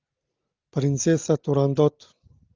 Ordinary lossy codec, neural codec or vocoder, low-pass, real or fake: Opus, 24 kbps; none; 7.2 kHz; real